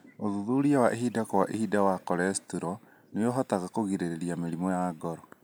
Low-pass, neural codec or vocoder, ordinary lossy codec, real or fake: none; none; none; real